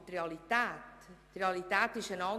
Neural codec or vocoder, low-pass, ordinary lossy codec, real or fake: none; 14.4 kHz; AAC, 96 kbps; real